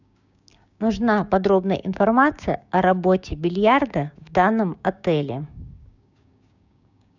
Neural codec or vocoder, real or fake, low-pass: codec, 16 kHz, 6 kbps, DAC; fake; 7.2 kHz